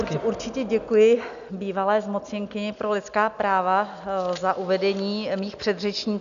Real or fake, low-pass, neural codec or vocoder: real; 7.2 kHz; none